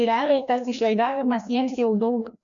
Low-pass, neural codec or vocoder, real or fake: 7.2 kHz; codec, 16 kHz, 1 kbps, FreqCodec, larger model; fake